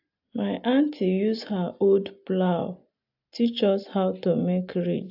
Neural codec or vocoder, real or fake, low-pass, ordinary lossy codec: none; real; 5.4 kHz; AAC, 48 kbps